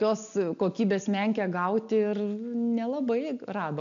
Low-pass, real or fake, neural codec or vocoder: 7.2 kHz; real; none